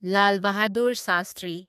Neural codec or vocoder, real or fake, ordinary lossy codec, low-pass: codec, 32 kHz, 1.9 kbps, SNAC; fake; none; 14.4 kHz